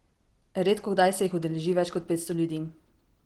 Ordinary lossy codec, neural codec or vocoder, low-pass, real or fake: Opus, 16 kbps; none; 19.8 kHz; real